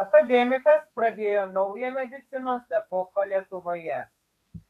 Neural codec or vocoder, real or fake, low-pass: codec, 32 kHz, 1.9 kbps, SNAC; fake; 14.4 kHz